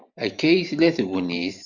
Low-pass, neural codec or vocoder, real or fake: 7.2 kHz; vocoder, 44.1 kHz, 128 mel bands every 512 samples, BigVGAN v2; fake